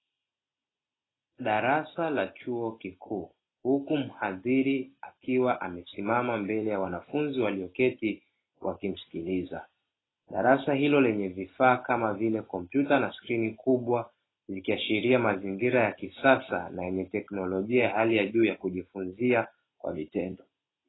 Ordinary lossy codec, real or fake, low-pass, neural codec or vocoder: AAC, 16 kbps; real; 7.2 kHz; none